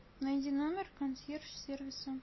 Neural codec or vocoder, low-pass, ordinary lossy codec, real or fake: none; 7.2 kHz; MP3, 24 kbps; real